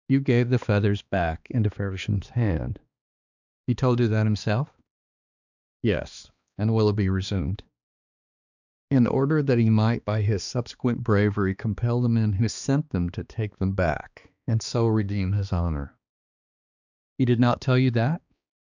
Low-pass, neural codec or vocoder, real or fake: 7.2 kHz; codec, 16 kHz, 2 kbps, X-Codec, HuBERT features, trained on balanced general audio; fake